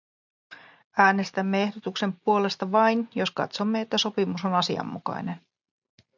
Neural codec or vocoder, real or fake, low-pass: none; real; 7.2 kHz